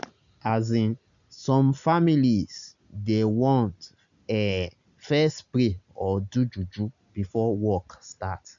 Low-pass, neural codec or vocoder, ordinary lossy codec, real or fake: 7.2 kHz; none; none; real